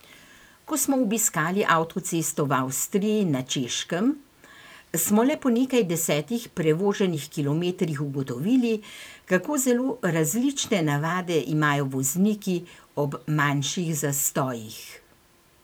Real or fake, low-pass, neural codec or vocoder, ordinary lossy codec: fake; none; vocoder, 44.1 kHz, 128 mel bands every 512 samples, BigVGAN v2; none